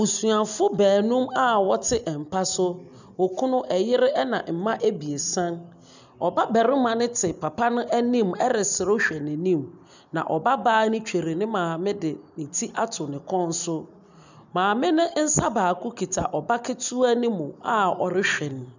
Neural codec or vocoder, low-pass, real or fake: none; 7.2 kHz; real